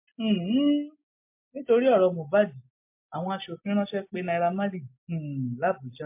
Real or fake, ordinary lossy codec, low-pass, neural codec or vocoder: real; MP3, 24 kbps; 3.6 kHz; none